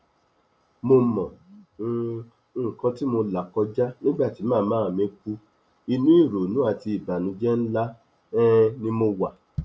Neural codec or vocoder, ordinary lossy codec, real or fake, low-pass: none; none; real; none